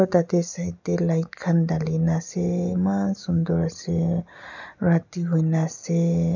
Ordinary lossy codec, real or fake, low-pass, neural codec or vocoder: none; real; 7.2 kHz; none